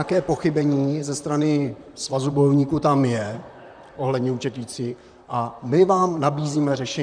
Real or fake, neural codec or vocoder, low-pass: fake; vocoder, 44.1 kHz, 128 mel bands, Pupu-Vocoder; 9.9 kHz